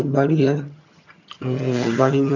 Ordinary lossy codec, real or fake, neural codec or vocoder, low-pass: none; fake; vocoder, 22.05 kHz, 80 mel bands, HiFi-GAN; 7.2 kHz